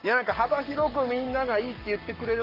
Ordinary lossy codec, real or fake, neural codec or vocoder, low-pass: Opus, 24 kbps; fake; codec, 44.1 kHz, 7.8 kbps, Pupu-Codec; 5.4 kHz